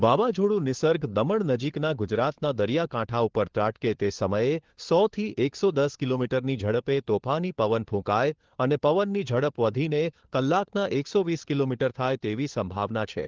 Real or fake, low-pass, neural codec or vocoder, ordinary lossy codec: fake; 7.2 kHz; codec, 16 kHz, 4 kbps, FunCodec, trained on LibriTTS, 50 frames a second; Opus, 16 kbps